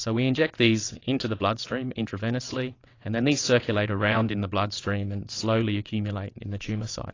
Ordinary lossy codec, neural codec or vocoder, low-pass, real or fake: AAC, 32 kbps; vocoder, 22.05 kHz, 80 mel bands, WaveNeXt; 7.2 kHz; fake